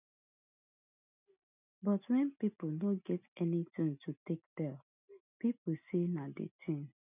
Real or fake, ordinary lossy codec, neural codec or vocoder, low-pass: real; none; none; 3.6 kHz